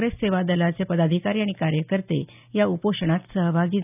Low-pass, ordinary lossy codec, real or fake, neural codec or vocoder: 3.6 kHz; none; real; none